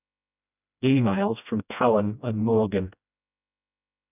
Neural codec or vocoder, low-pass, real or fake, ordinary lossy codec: codec, 16 kHz, 1 kbps, FreqCodec, smaller model; 3.6 kHz; fake; none